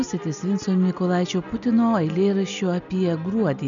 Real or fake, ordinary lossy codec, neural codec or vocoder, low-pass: real; MP3, 96 kbps; none; 7.2 kHz